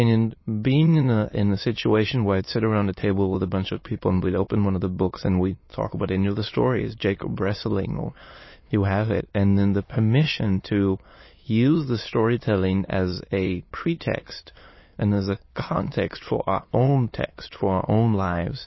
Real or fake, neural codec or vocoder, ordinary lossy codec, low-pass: fake; autoencoder, 22.05 kHz, a latent of 192 numbers a frame, VITS, trained on many speakers; MP3, 24 kbps; 7.2 kHz